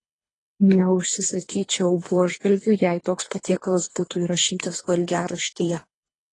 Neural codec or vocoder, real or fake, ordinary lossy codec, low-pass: codec, 24 kHz, 3 kbps, HILCodec; fake; AAC, 32 kbps; 10.8 kHz